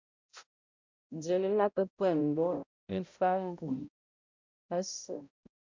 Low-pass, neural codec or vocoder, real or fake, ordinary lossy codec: 7.2 kHz; codec, 16 kHz, 0.5 kbps, X-Codec, HuBERT features, trained on balanced general audio; fake; MP3, 64 kbps